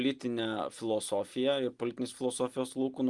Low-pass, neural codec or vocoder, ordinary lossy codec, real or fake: 10.8 kHz; none; Opus, 24 kbps; real